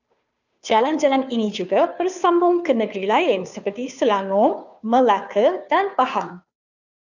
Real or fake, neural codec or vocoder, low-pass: fake; codec, 16 kHz, 2 kbps, FunCodec, trained on Chinese and English, 25 frames a second; 7.2 kHz